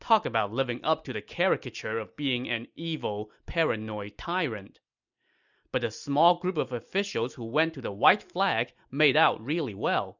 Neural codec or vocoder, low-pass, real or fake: none; 7.2 kHz; real